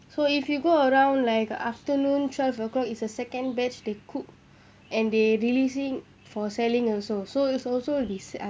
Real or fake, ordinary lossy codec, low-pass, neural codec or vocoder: real; none; none; none